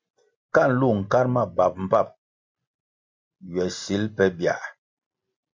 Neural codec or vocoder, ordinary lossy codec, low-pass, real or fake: none; MP3, 48 kbps; 7.2 kHz; real